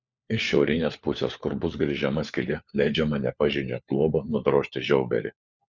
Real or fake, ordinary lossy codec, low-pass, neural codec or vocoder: fake; Opus, 64 kbps; 7.2 kHz; codec, 16 kHz, 4 kbps, FunCodec, trained on LibriTTS, 50 frames a second